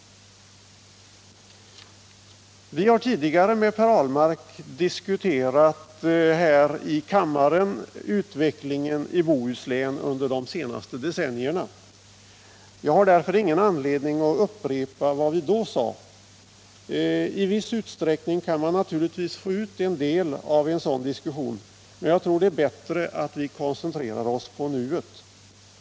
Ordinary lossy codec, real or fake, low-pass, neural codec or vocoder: none; real; none; none